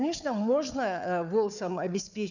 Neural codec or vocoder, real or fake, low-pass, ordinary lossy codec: codec, 16 kHz, 4 kbps, FunCodec, trained on Chinese and English, 50 frames a second; fake; 7.2 kHz; none